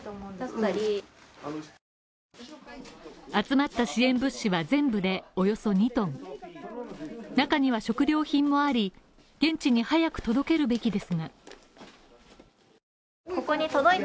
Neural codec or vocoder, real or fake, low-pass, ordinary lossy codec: none; real; none; none